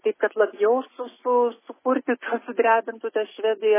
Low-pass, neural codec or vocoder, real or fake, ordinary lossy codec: 3.6 kHz; none; real; MP3, 16 kbps